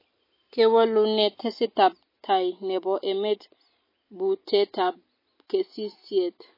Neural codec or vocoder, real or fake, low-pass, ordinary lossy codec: none; real; 5.4 kHz; MP3, 24 kbps